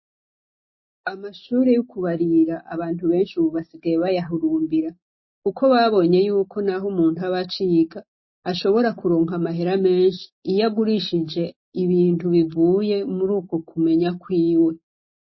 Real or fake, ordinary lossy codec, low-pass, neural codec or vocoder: real; MP3, 24 kbps; 7.2 kHz; none